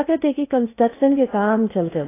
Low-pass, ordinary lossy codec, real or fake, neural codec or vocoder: 3.6 kHz; AAC, 16 kbps; fake; codec, 16 kHz in and 24 kHz out, 0.6 kbps, FocalCodec, streaming, 4096 codes